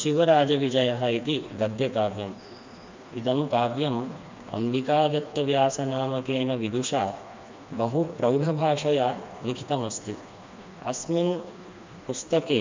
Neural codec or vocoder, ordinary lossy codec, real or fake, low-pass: codec, 16 kHz, 2 kbps, FreqCodec, smaller model; MP3, 64 kbps; fake; 7.2 kHz